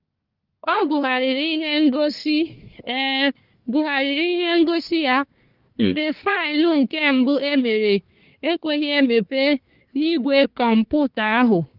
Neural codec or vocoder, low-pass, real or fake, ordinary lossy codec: codec, 24 kHz, 1 kbps, SNAC; 5.4 kHz; fake; Opus, 32 kbps